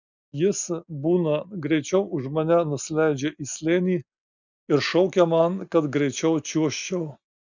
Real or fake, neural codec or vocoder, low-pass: real; none; 7.2 kHz